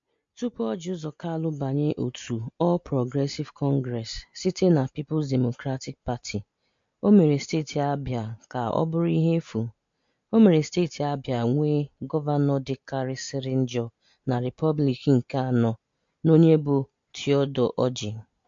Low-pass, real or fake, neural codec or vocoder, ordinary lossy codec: 7.2 kHz; real; none; MP3, 48 kbps